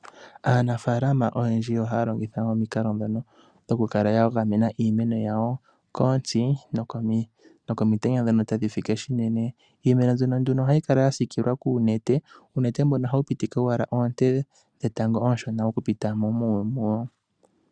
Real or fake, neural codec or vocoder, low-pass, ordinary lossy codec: real; none; 9.9 kHz; Opus, 64 kbps